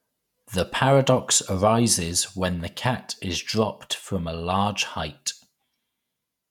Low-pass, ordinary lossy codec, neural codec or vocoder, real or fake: 19.8 kHz; none; vocoder, 48 kHz, 128 mel bands, Vocos; fake